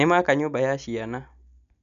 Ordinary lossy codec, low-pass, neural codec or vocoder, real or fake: none; 7.2 kHz; none; real